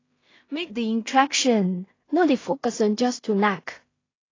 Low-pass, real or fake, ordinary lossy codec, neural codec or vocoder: 7.2 kHz; fake; AAC, 32 kbps; codec, 16 kHz in and 24 kHz out, 0.4 kbps, LongCat-Audio-Codec, two codebook decoder